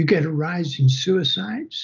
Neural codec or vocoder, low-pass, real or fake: none; 7.2 kHz; real